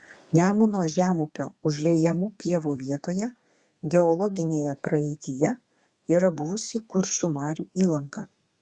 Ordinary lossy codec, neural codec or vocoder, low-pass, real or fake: Opus, 64 kbps; codec, 32 kHz, 1.9 kbps, SNAC; 10.8 kHz; fake